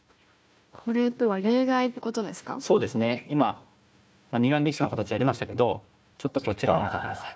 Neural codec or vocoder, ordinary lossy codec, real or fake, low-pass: codec, 16 kHz, 1 kbps, FunCodec, trained on Chinese and English, 50 frames a second; none; fake; none